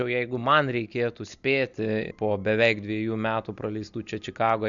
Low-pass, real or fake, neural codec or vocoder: 7.2 kHz; real; none